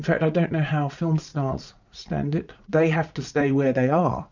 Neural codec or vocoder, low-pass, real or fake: vocoder, 44.1 kHz, 80 mel bands, Vocos; 7.2 kHz; fake